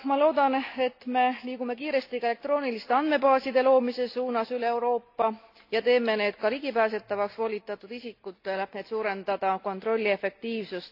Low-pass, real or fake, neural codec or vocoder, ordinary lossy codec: 5.4 kHz; real; none; AAC, 32 kbps